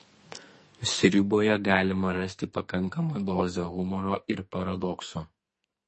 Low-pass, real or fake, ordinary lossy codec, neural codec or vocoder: 10.8 kHz; fake; MP3, 32 kbps; codec, 44.1 kHz, 2.6 kbps, SNAC